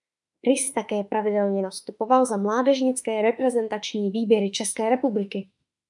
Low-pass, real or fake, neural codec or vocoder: 10.8 kHz; fake; autoencoder, 48 kHz, 32 numbers a frame, DAC-VAE, trained on Japanese speech